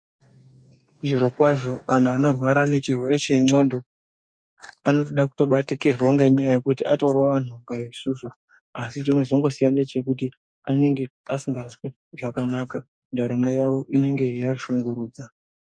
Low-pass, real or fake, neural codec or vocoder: 9.9 kHz; fake; codec, 44.1 kHz, 2.6 kbps, DAC